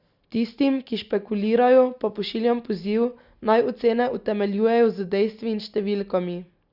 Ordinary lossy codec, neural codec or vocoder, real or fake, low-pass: Opus, 64 kbps; none; real; 5.4 kHz